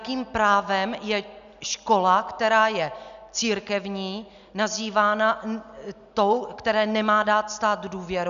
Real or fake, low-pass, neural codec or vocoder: real; 7.2 kHz; none